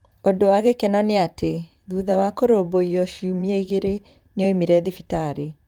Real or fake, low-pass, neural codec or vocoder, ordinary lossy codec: fake; 19.8 kHz; vocoder, 44.1 kHz, 128 mel bands, Pupu-Vocoder; Opus, 32 kbps